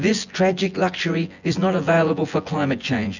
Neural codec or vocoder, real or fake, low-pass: vocoder, 24 kHz, 100 mel bands, Vocos; fake; 7.2 kHz